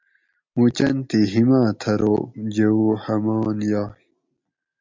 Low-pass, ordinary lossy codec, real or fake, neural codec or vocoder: 7.2 kHz; MP3, 64 kbps; real; none